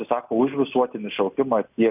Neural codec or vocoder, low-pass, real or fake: none; 3.6 kHz; real